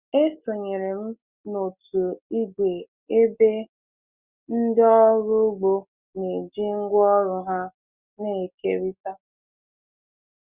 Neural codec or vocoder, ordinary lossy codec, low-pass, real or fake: none; Opus, 64 kbps; 3.6 kHz; real